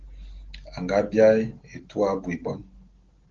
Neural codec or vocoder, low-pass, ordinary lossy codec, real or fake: none; 7.2 kHz; Opus, 16 kbps; real